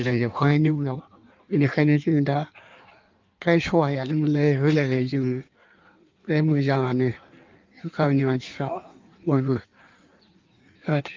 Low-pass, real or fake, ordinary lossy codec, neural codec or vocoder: 7.2 kHz; fake; Opus, 24 kbps; codec, 16 kHz in and 24 kHz out, 1.1 kbps, FireRedTTS-2 codec